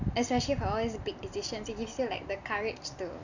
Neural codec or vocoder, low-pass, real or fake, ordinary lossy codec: none; 7.2 kHz; real; none